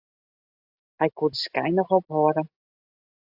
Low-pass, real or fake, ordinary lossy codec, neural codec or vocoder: 5.4 kHz; real; AAC, 48 kbps; none